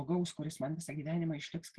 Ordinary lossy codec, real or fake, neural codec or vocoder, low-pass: Opus, 16 kbps; real; none; 10.8 kHz